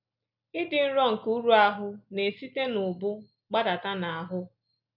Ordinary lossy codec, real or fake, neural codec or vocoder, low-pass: none; real; none; 5.4 kHz